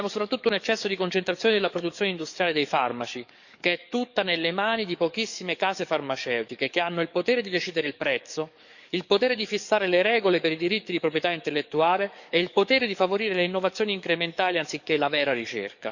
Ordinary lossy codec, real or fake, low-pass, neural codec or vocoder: none; fake; 7.2 kHz; codec, 44.1 kHz, 7.8 kbps, DAC